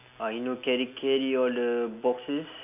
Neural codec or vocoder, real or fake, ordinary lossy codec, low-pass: none; real; AAC, 32 kbps; 3.6 kHz